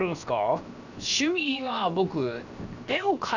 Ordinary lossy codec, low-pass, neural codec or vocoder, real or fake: none; 7.2 kHz; codec, 16 kHz, 0.7 kbps, FocalCodec; fake